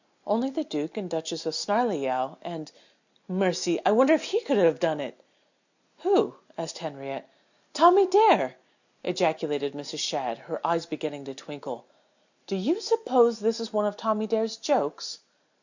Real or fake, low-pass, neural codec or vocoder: real; 7.2 kHz; none